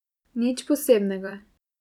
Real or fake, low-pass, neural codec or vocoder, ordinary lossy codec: fake; 19.8 kHz; vocoder, 44.1 kHz, 128 mel bands every 256 samples, BigVGAN v2; none